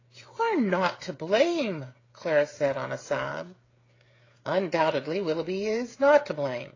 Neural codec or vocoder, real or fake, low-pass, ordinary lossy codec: codec, 16 kHz, 16 kbps, FreqCodec, smaller model; fake; 7.2 kHz; AAC, 32 kbps